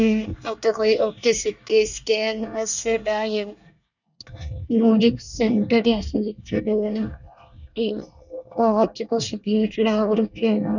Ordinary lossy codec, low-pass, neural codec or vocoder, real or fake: none; 7.2 kHz; codec, 24 kHz, 1 kbps, SNAC; fake